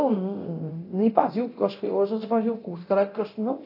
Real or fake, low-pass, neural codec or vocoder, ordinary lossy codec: fake; 5.4 kHz; codec, 24 kHz, 0.9 kbps, DualCodec; AAC, 32 kbps